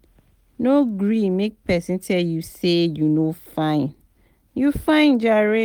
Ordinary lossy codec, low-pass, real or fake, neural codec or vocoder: Opus, 32 kbps; 19.8 kHz; real; none